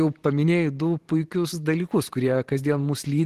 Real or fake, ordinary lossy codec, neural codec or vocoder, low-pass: fake; Opus, 16 kbps; vocoder, 44.1 kHz, 128 mel bands every 512 samples, BigVGAN v2; 14.4 kHz